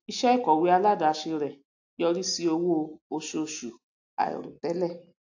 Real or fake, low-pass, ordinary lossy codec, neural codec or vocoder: real; 7.2 kHz; AAC, 48 kbps; none